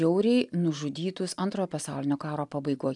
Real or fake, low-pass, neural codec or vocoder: real; 10.8 kHz; none